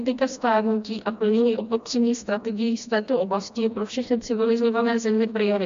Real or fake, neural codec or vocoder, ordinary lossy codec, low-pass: fake; codec, 16 kHz, 1 kbps, FreqCodec, smaller model; AAC, 64 kbps; 7.2 kHz